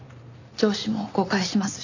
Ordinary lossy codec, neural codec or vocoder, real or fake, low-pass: none; none; real; 7.2 kHz